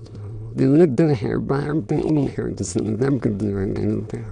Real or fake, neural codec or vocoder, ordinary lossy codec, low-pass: fake; autoencoder, 22.05 kHz, a latent of 192 numbers a frame, VITS, trained on many speakers; none; 9.9 kHz